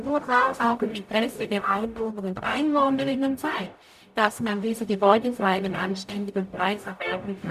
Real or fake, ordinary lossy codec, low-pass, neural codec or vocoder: fake; none; 14.4 kHz; codec, 44.1 kHz, 0.9 kbps, DAC